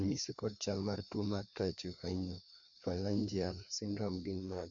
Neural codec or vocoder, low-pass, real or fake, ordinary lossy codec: codec, 16 kHz, 2 kbps, FreqCodec, larger model; 7.2 kHz; fake; MP3, 48 kbps